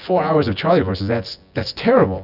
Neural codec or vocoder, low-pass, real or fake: vocoder, 24 kHz, 100 mel bands, Vocos; 5.4 kHz; fake